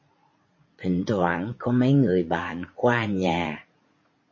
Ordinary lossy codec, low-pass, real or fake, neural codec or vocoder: MP3, 32 kbps; 7.2 kHz; fake; vocoder, 44.1 kHz, 80 mel bands, Vocos